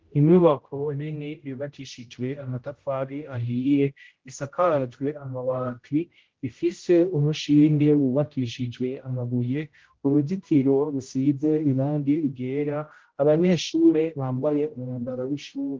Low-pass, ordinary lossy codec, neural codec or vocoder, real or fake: 7.2 kHz; Opus, 16 kbps; codec, 16 kHz, 0.5 kbps, X-Codec, HuBERT features, trained on general audio; fake